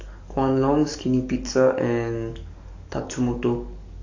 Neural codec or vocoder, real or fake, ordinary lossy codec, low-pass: codec, 44.1 kHz, 7.8 kbps, DAC; fake; AAC, 48 kbps; 7.2 kHz